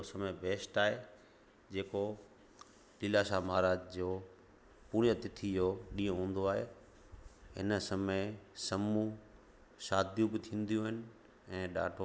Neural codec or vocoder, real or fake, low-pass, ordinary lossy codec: none; real; none; none